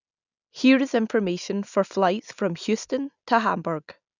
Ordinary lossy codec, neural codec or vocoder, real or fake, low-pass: none; none; real; 7.2 kHz